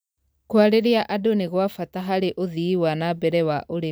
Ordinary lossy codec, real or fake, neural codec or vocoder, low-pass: none; real; none; none